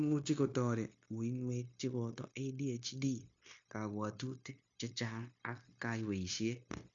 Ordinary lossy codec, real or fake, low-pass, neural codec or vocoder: MP3, 48 kbps; fake; 7.2 kHz; codec, 16 kHz, 0.9 kbps, LongCat-Audio-Codec